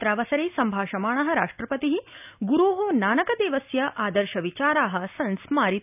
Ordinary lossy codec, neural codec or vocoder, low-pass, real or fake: none; none; 3.6 kHz; real